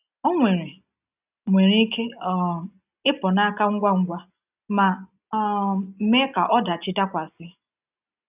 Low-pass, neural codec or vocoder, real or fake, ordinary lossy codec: 3.6 kHz; none; real; none